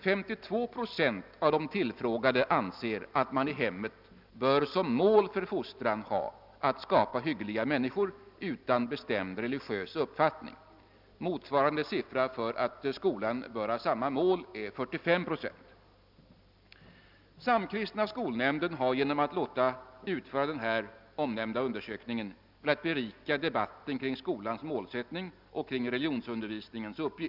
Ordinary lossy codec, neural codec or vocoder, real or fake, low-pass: none; none; real; 5.4 kHz